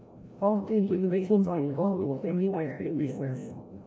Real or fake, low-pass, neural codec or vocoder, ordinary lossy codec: fake; none; codec, 16 kHz, 0.5 kbps, FreqCodec, larger model; none